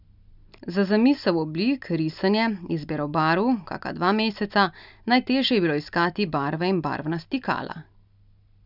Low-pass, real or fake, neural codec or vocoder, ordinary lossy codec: 5.4 kHz; real; none; none